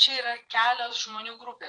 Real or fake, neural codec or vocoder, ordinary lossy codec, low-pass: fake; vocoder, 22.05 kHz, 80 mel bands, Vocos; AAC, 48 kbps; 9.9 kHz